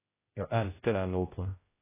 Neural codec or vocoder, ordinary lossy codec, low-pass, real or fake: codec, 16 kHz, 0.5 kbps, X-Codec, HuBERT features, trained on general audio; AAC, 16 kbps; 3.6 kHz; fake